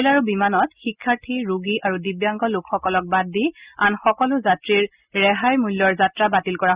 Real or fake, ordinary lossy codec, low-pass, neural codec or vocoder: real; Opus, 64 kbps; 3.6 kHz; none